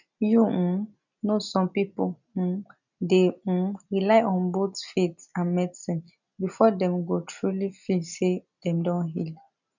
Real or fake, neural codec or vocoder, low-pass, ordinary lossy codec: real; none; 7.2 kHz; none